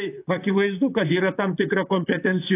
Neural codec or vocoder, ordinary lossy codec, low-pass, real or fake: vocoder, 44.1 kHz, 128 mel bands, Pupu-Vocoder; AAC, 24 kbps; 3.6 kHz; fake